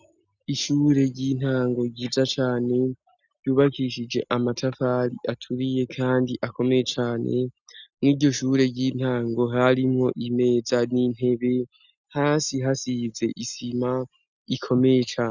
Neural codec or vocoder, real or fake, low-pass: none; real; 7.2 kHz